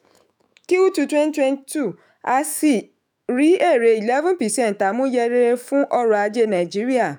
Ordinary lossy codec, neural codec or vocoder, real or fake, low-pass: none; autoencoder, 48 kHz, 128 numbers a frame, DAC-VAE, trained on Japanese speech; fake; none